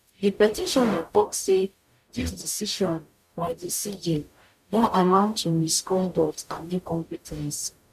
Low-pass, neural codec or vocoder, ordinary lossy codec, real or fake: 14.4 kHz; codec, 44.1 kHz, 0.9 kbps, DAC; none; fake